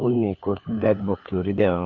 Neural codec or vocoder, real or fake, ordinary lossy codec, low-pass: codec, 16 kHz, 4 kbps, FunCodec, trained on LibriTTS, 50 frames a second; fake; AAC, 48 kbps; 7.2 kHz